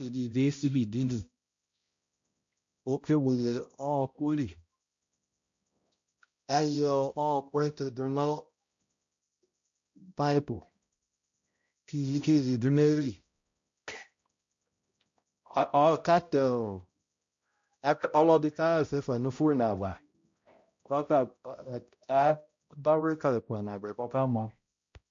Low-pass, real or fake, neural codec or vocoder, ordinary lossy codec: 7.2 kHz; fake; codec, 16 kHz, 0.5 kbps, X-Codec, HuBERT features, trained on balanced general audio; MP3, 48 kbps